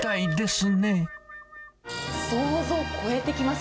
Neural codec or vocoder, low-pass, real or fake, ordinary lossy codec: none; none; real; none